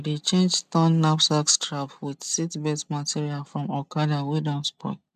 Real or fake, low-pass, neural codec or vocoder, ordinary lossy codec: real; 14.4 kHz; none; none